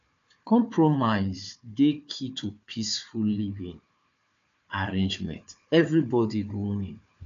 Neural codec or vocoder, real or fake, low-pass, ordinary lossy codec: codec, 16 kHz, 4 kbps, FunCodec, trained on Chinese and English, 50 frames a second; fake; 7.2 kHz; AAC, 64 kbps